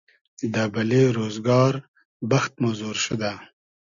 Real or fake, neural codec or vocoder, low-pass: real; none; 7.2 kHz